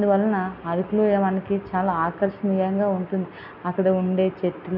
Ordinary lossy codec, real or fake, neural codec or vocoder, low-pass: none; real; none; 5.4 kHz